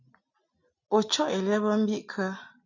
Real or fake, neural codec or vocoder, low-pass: real; none; 7.2 kHz